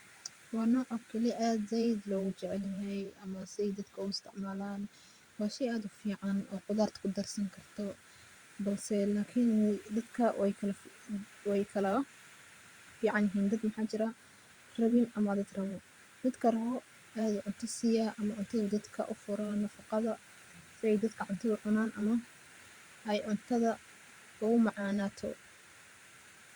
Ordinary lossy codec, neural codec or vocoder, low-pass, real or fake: Opus, 64 kbps; vocoder, 44.1 kHz, 128 mel bands every 512 samples, BigVGAN v2; 19.8 kHz; fake